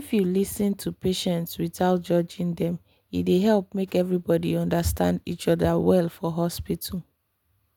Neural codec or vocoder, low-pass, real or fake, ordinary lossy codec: none; none; real; none